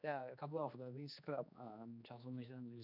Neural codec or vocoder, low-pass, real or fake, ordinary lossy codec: codec, 16 kHz, 2 kbps, X-Codec, HuBERT features, trained on general audio; 5.4 kHz; fake; none